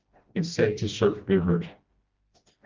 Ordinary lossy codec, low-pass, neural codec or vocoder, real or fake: Opus, 32 kbps; 7.2 kHz; codec, 16 kHz, 1 kbps, FreqCodec, smaller model; fake